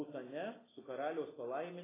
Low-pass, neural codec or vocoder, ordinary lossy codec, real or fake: 3.6 kHz; none; AAC, 16 kbps; real